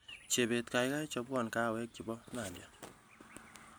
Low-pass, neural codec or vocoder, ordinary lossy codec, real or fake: none; none; none; real